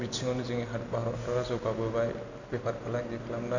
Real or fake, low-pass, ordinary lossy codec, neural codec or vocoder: real; 7.2 kHz; none; none